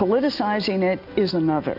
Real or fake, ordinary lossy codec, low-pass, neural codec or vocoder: real; AAC, 48 kbps; 5.4 kHz; none